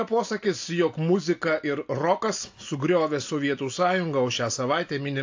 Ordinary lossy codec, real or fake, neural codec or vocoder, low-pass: AAC, 48 kbps; real; none; 7.2 kHz